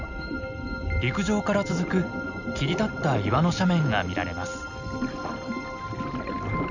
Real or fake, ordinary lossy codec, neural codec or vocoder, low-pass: real; none; none; 7.2 kHz